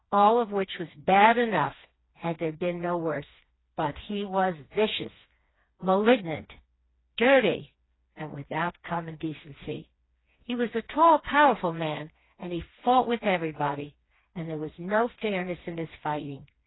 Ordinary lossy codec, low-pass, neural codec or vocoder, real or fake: AAC, 16 kbps; 7.2 kHz; codec, 16 kHz, 2 kbps, FreqCodec, smaller model; fake